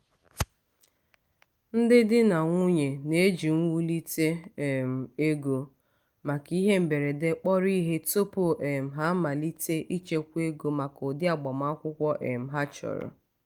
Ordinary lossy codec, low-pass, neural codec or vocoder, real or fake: Opus, 32 kbps; 19.8 kHz; none; real